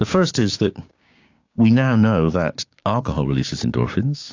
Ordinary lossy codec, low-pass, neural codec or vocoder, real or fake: AAC, 48 kbps; 7.2 kHz; codec, 16 kHz, 6 kbps, DAC; fake